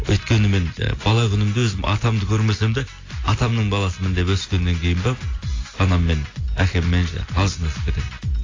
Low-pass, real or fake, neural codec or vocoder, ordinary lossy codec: 7.2 kHz; real; none; AAC, 32 kbps